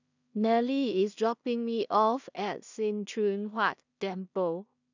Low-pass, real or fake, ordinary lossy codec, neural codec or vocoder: 7.2 kHz; fake; none; codec, 16 kHz in and 24 kHz out, 0.4 kbps, LongCat-Audio-Codec, two codebook decoder